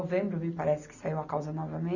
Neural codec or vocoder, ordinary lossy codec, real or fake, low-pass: none; none; real; 7.2 kHz